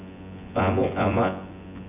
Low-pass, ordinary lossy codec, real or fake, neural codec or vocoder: 3.6 kHz; none; fake; vocoder, 24 kHz, 100 mel bands, Vocos